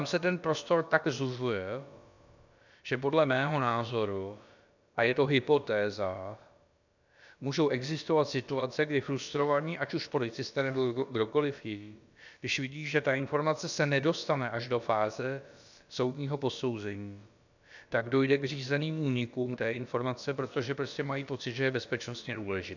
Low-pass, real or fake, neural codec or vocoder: 7.2 kHz; fake; codec, 16 kHz, about 1 kbps, DyCAST, with the encoder's durations